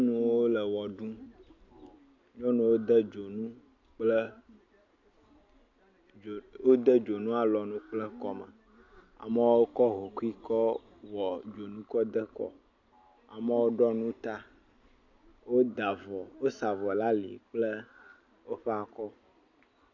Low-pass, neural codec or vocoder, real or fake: 7.2 kHz; none; real